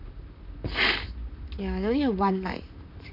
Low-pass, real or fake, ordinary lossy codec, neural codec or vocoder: 5.4 kHz; fake; none; vocoder, 44.1 kHz, 128 mel bands, Pupu-Vocoder